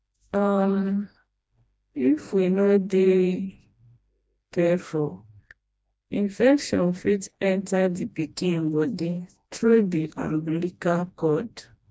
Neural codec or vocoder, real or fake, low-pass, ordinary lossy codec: codec, 16 kHz, 1 kbps, FreqCodec, smaller model; fake; none; none